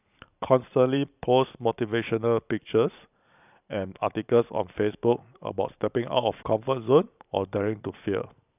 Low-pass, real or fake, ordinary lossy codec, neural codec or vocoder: 3.6 kHz; fake; none; vocoder, 44.1 kHz, 128 mel bands every 512 samples, BigVGAN v2